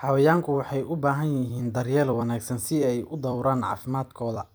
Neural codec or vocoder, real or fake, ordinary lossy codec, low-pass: vocoder, 44.1 kHz, 128 mel bands every 256 samples, BigVGAN v2; fake; none; none